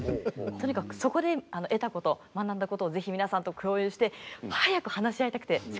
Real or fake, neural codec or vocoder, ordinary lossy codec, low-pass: real; none; none; none